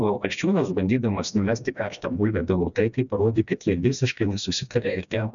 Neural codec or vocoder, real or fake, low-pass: codec, 16 kHz, 1 kbps, FreqCodec, smaller model; fake; 7.2 kHz